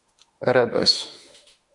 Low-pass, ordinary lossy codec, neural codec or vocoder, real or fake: 10.8 kHz; MP3, 64 kbps; autoencoder, 48 kHz, 32 numbers a frame, DAC-VAE, trained on Japanese speech; fake